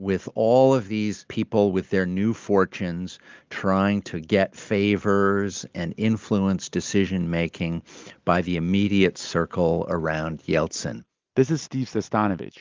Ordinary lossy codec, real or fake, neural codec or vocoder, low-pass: Opus, 32 kbps; real; none; 7.2 kHz